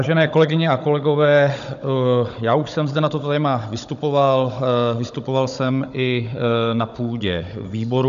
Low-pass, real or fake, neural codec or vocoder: 7.2 kHz; fake; codec, 16 kHz, 16 kbps, FunCodec, trained on Chinese and English, 50 frames a second